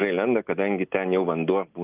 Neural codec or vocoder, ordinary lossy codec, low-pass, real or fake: none; Opus, 24 kbps; 3.6 kHz; real